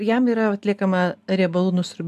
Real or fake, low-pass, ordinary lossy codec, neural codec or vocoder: real; 14.4 kHz; MP3, 96 kbps; none